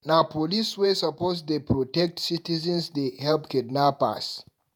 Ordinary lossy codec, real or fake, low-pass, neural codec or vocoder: none; fake; none; vocoder, 48 kHz, 128 mel bands, Vocos